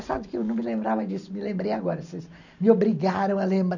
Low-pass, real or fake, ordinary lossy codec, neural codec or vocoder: 7.2 kHz; real; none; none